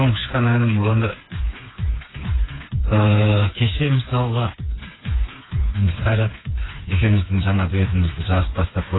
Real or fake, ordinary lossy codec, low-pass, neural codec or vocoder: fake; AAC, 16 kbps; 7.2 kHz; codec, 16 kHz, 4 kbps, FreqCodec, smaller model